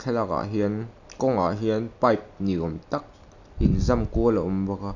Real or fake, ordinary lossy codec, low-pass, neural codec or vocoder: real; none; 7.2 kHz; none